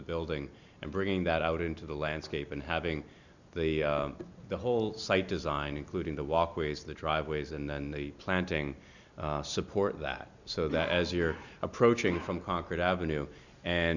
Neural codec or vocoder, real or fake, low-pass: none; real; 7.2 kHz